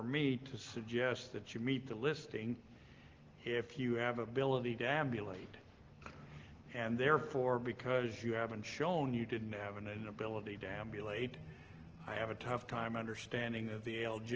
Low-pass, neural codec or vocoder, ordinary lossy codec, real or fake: 7.2 kHz; none; Opus, 16 kbps; real